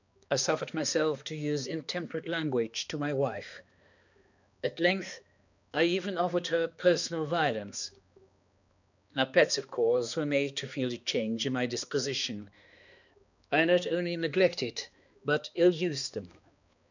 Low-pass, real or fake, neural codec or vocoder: 7.2 kHz; fake; codec, 16 kHz, 2 kbps, X-Codec, HuBERT features, trained on balanced general audio